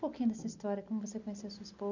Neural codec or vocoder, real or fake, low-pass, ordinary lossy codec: none; real; 7.2 kHz; none